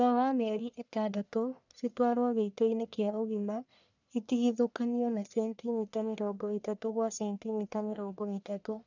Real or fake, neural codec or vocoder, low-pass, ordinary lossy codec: fake; codec, 44.1 kHz, 1.7 kbps, Pupu-Codec; 7.2 kHz; none